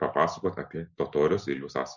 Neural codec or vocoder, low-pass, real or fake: none; 7.2 kHz; real